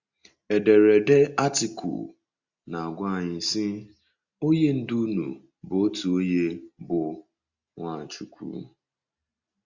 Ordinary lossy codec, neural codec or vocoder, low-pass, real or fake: Opus, 64 kbps; none; 7.2 kHz; real